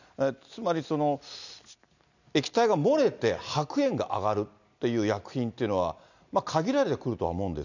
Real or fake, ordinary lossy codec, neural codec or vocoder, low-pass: real; none; none; 7.2 kHz